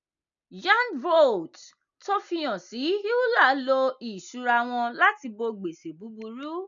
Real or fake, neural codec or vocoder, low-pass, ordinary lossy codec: real; none; 7.2 kHz; none